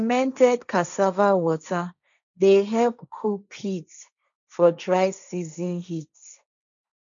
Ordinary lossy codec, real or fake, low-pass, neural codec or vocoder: none; fake; 7.2 kHz; codec, 16 kHz, 1.1 kbps, Voila-Tokenizer